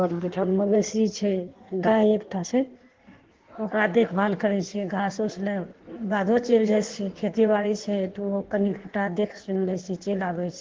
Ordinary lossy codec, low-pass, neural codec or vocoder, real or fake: Opus, 16 kbps; 7.2 kHz; codec, 16 kHz in and 24 kHz out, 1.1 kbps, FireRedTTS-2 codec; fake